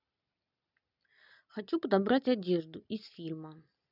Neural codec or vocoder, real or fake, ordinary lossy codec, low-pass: none; real; none; 5.4 kHz